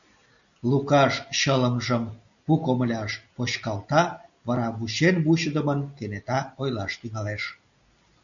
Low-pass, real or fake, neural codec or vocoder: 7.2 kHz; real; none